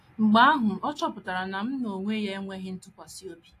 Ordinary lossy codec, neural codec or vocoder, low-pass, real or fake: AAC, 48 kbps; none; 14.4 kHz; real